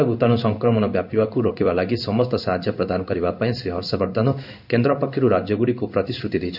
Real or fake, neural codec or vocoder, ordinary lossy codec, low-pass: fake; codec, 16 kHz in and 24 kHz out, 1 kbps, XY-Tokenizer; none; 5.4 kHz